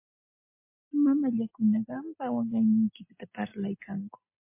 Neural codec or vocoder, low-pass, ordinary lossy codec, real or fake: none; 3.6 kHz; MP3, 24 kbps; real